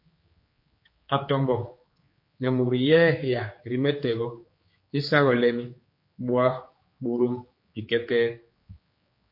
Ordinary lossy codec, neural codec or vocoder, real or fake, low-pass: MP3, 32 kbps; codec, 16 kHz, 4 kbps, X-Codec, HuBERT features, trained on general audio; fake; 5.4 kHz